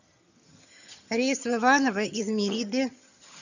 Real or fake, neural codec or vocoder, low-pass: fake; vocoder, 22.05 kHz, 80 mel bands, HiFi-GAN; 7.2 kHz